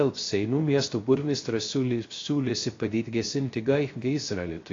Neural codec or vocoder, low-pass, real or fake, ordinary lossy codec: codec, 16 kHz, 0.3 kbps, FocalCodec; 7.2 kHz; fake; AAC, 32 kbps